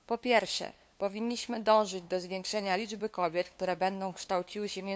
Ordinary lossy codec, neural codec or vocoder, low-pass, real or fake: none; codec, 16 kHz, 2 kbps, FunCodec, trained on LibriTTS, 25 frames a second; none; fake